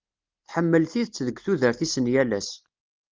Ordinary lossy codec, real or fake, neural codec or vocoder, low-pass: Opus, 24 kbps; real; none; 7.2 kHz